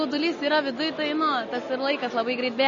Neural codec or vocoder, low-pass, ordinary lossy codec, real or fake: none; 7.2 kHz; MP3, 32 kbps; real